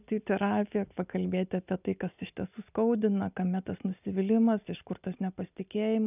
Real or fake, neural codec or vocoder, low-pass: real; none; 3.6 kHz